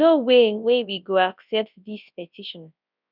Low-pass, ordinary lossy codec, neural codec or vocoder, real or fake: 5.4 kHz; none; codec, 24 kHz, 0.9 kbps, WavTokenizer, large speech release; fake